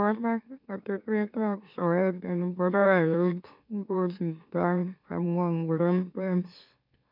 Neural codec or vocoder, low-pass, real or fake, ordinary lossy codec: autoencoder, 44.1 kHz, a latent of 192 numbers a frame, MeloTTS; 5.4 kHz; fake; none